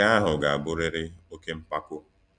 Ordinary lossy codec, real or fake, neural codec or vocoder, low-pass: none; real; none; 9.9 kHz